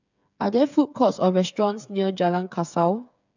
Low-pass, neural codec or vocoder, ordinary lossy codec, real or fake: 7.2 kHz; codec, 16 kHz, 8 kbps, FreqCodec, smaller model; none; fake